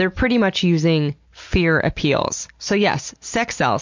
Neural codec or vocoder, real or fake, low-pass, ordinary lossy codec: none; real; 7.2 kHz; MP3, 48 kbps